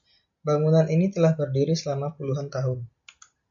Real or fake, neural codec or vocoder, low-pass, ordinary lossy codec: real; none; 7.2 kHz; MP3, 64 kbps